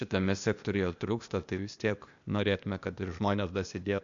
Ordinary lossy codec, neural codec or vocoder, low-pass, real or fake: MP3, 64 kbps; codec, 16 kHz, 0.8 kbps, ZipCodec; 7.2 kHz; fake